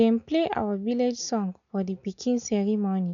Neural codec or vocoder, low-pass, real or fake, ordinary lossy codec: none; 7.2 kHz; real; none